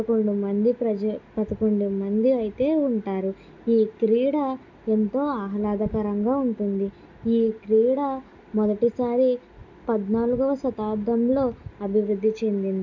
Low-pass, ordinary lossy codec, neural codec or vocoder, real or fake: 7.2 kHz; none; none; real